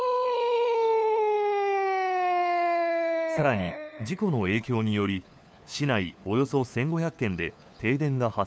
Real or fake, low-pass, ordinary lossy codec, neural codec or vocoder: fake; none; none; codec, 16 kHz, 4 kbps, FunCodec, trained on LibriTTS, 50 frames a second